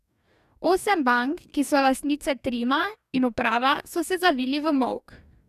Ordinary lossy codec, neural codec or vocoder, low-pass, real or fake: none; codec, 44.1 kHz, 2.6 kbps, DAC; 14.4 kHz; fake